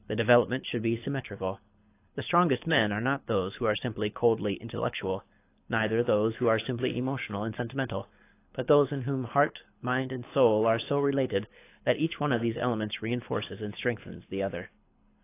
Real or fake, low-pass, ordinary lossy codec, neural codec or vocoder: fake; 3.6 kHz; AAC, 24 kbps; codec, 24 kHz, 6 kbps, HILCodec